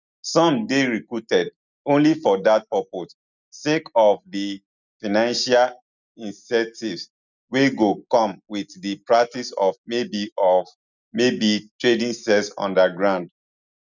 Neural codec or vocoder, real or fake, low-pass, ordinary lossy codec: none; real; 7.2 kHz; none